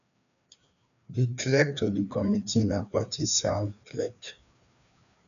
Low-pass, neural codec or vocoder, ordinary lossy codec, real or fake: 7.2 kHz; codec, 16 kHz, 2 kbps, FreqCodec, larger model; none; fake